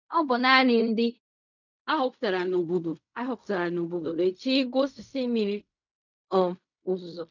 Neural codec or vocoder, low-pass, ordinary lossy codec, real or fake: codec, 16 kHz in and 24 kHz out, 0.4 kbps, LongCat-Audio-Codec, fine tuned four codebook decoder; 7.2 kHz; none; fake